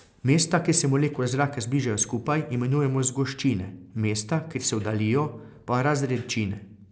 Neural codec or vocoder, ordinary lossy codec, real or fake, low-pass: none; none; real; none